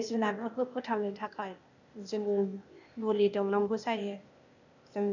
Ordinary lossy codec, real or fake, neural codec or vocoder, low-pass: none; fake; codec, 16 kHz, 0.8 kbps, ZipCodec; 7.2 kHz